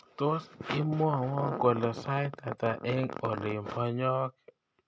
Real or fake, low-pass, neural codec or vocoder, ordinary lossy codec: real; none; none; none